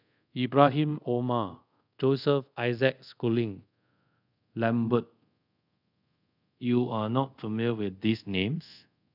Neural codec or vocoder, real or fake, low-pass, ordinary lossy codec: codec, 24 kHz, 0.5 kbps, DualCodec; fake; 5.4 kHz; none